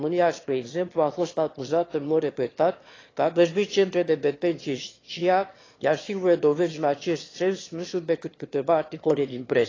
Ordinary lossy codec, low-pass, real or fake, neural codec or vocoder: AAC, 32 kbps; 7.2 kHz; fake; autoencoder, 22.05 kHz, a latent of 192 numbers a frame, VITS, trained on one speaker